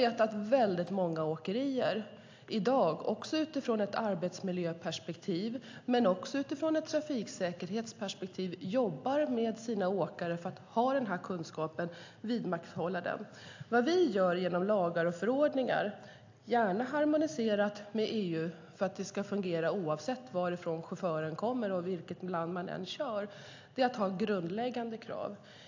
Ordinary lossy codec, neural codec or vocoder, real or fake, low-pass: AAC, 48 kbps; none; real; 7.2 kHz